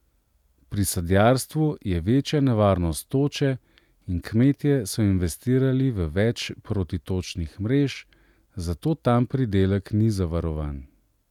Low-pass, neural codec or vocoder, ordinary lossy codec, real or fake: 19.8 kHz; none; none; real